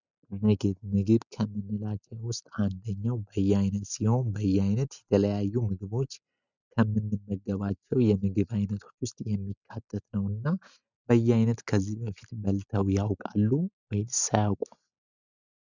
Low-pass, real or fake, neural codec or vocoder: 7.2 kHz; real; none